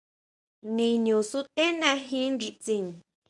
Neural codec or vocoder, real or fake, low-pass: codec, 24 kHz, 0.9 kbps, WavTokenizer, medium speech release version 1; fake; 10.8 kHz